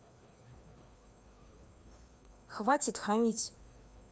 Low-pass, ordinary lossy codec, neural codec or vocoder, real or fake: none; none; codec, 16 kHz, 2 kbps, FreqCodec, larger model; fake